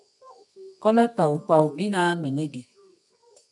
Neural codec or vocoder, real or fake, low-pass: codec, 24 kHz, 0.9 kbps, WavTokenizer, medium music audio release; fake; 10.8 kHz